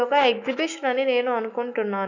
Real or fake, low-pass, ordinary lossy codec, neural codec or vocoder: fake; 7.2 kHz; none; autoencoder, 48 kHz, 128 numbers a frame, DAC-VAE, trained on Japanese speech